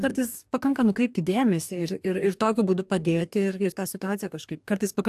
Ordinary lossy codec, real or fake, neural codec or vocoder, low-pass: AAC, 96 kbps; fake; codec, 44.1 kHz, 2.6 kbps, DAC; 14.4 kHz